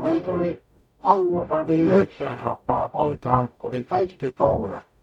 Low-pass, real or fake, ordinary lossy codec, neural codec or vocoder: 19.8 kHz; fake; none; codec, 44.1 kHz, 0.9 kbps, DAC